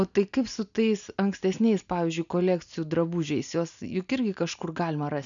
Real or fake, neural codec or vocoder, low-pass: real; none; 7.2 kHz